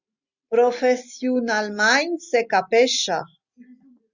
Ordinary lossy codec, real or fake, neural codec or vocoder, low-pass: Opus, 64 kbps; real; none; 7.2 kHz